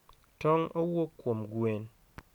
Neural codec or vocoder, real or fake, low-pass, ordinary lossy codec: none; real; 19.8 kHz; none